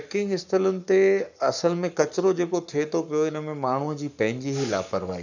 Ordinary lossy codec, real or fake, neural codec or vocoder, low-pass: none; fake; codec, 44.1 kHz, 7.8 kbps, Pupu-Codec; 7.2 kHz